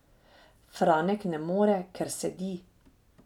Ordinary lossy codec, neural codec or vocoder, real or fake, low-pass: none; none; real; 19.8 kHz